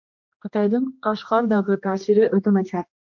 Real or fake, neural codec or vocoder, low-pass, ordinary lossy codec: fake; codec, 16 kHz, 1 kbps, X-Codec, HuBERT features, trained on general audio; 7.2 kHz; MP3, 48 kbps